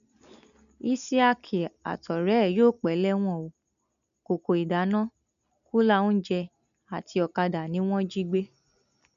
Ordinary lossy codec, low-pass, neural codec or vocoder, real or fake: none; 7.2 kHz; none; real